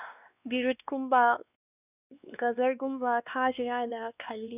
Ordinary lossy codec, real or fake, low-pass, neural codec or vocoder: none; fake; 3.6 kHz; codec, 16 kHz, 2 kbps, X-Codec, WavLM features, trained on Multilingual LibriSpeech